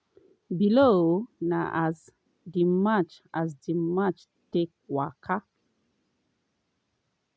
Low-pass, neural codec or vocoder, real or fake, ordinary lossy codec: none; none; real; none